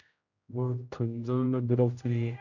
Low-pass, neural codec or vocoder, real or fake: 7.2 kHz; codec, 16 kHz, 0.5 kbps, X-Codec, HuBERT features, trained on general audio; fake